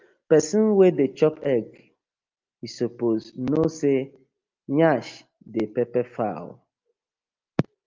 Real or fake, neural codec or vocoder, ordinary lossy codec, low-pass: real; none; Opus, 24 kbps; 7.2 kHz